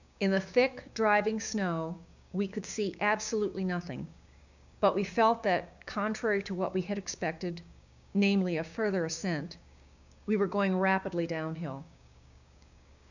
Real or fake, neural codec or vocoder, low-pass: fake; codec, 16 kHz, 6 kbps, DAC; 7.2 kHz